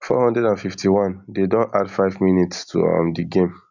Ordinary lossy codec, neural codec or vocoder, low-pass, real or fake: none; none; 7.2 kHz; real